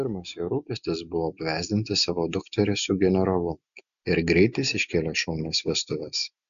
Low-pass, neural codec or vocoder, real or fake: 7.2 kHz; none; real